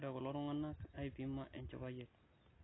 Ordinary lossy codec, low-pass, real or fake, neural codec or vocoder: AAC, 16 kbps; 7.2 kHz; real; none